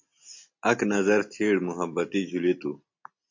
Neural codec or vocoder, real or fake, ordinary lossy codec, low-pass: none; real; MP3, 48 kbps; 7.2 kHz